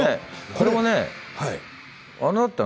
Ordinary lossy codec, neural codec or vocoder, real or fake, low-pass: none; none; real; none